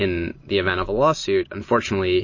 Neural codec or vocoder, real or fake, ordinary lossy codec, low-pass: none; real; MP3, 32 kbps; 7.2 kHz